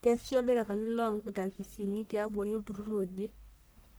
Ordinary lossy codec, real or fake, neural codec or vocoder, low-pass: none; fake; codec, 44.1 kHz, 1.7 kbps, Pupu-Codec; none